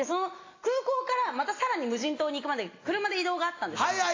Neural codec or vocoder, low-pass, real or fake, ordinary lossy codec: none; 7.2 kHz; real; AAC, 32 kbps